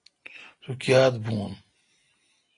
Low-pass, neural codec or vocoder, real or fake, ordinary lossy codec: 9.9 kHz; none; real; AAC, 32 kbps